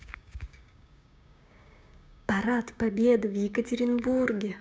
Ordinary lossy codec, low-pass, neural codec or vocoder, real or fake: none; none; codec, 16 kHz, 6 kbps, DAC; fake